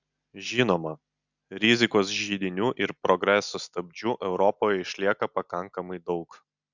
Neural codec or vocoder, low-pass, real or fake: none; 7.2 kHz; real